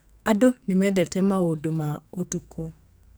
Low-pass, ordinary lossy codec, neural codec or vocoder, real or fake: none; none; codec, 44.1 kHz, 2.6 kbps, SNAC; fake